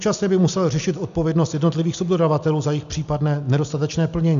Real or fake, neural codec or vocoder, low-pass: real; none; 7.2 kHz